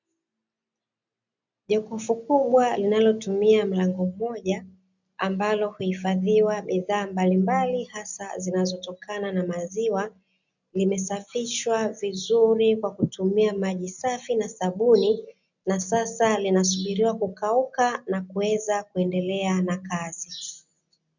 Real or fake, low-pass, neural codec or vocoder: real; 7.2 kHz; none